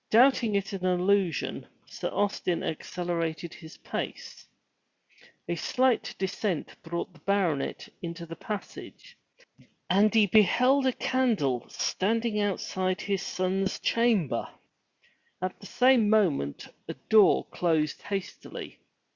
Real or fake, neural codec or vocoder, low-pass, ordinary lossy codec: real; none; 7.2 kHz; Opus, 64 kbps